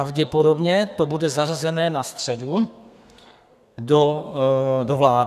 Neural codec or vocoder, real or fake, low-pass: codec, 32 kHz, 1.9 kbps, SNAC; fake; 14.4 kHz